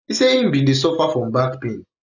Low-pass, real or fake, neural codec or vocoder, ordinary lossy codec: 7.2 kHz; real; none; none